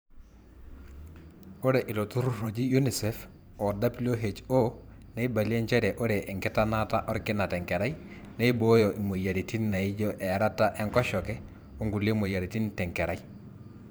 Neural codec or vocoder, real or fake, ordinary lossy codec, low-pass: vocoder, 44.1 kHz, 128 mel bands every 512 samples, BigVGAN v2; fake; none; none